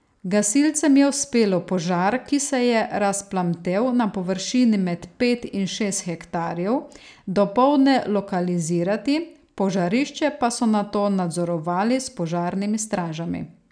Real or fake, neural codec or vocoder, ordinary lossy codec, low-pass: real; none; none; 9.9 kHz